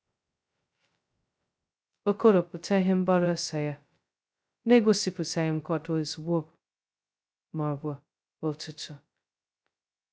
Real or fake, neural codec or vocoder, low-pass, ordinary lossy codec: fake; codec, 16 kHz, 0.2 kbps, FocalCodec; none; none